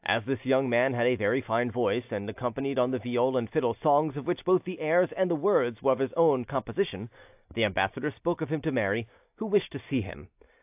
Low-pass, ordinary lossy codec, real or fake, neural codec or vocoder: 3.6 kHz; AAC, 32 kbps; real; none